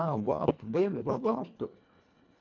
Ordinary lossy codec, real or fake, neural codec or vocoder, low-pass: none; fake; codec, 24 kHz, 1.5 kbps, HILCodec; 7.2 kHz